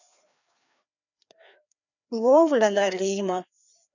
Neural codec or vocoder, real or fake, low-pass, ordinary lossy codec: codec, 16 kHz, 2 kbps, FreqCodec, larger model; fake; 7.2 kHz; none